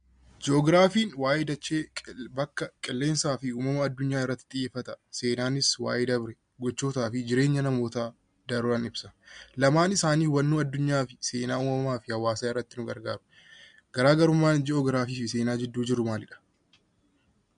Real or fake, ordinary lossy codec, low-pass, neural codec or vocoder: real; MP3, 64 kbps; 9.9 kHz; none